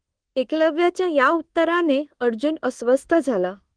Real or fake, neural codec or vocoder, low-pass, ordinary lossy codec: fake; codec, 24 kHz, 1.2 kbps, DualCodec; 9.9 kHz; Opus, 16 kbps